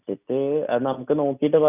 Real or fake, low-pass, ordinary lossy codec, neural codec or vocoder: real; 3.6 kHz; none; none